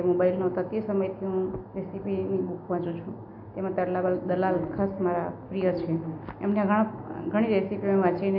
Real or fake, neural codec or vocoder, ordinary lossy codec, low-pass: real; none; AAC, 48 kbps; 5.4 kHz